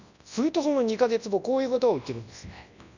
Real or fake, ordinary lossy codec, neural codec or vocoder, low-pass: fake; none; codec, 24 kHz, 0.9 kbps, WavTokenizer, large speech release; 7.2 kHz